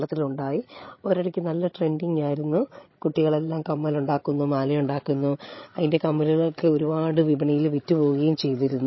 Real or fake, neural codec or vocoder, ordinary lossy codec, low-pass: fake; codec, 16 kHz, 16 kbps, FreqCodec, larger model; MP3, 24 kbps; 7.2 kHz